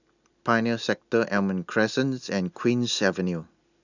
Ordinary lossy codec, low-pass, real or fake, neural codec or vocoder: none; 7.2 kHz; real; none